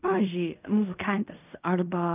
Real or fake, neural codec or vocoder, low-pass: fake; codec, 16 kHz in and 24 kHz out, 0.4 kbps, LongCat-Audio-Codec, fine tuned four codebook decoder; 3.6 kHz